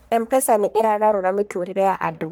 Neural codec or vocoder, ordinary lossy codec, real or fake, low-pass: codec, 44.1 kHz, 1.7 kbps, Pupu-Codec; none; fake; none